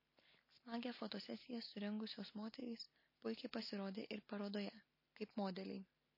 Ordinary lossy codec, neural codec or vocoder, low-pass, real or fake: MP3, 24 kbps; none; 5.4 kHz; real